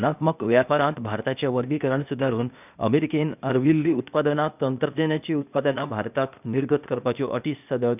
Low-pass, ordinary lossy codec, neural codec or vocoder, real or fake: 3.6 kHz; none; codec, 16 kHz, 0.8 kbps, ZipCodec; fake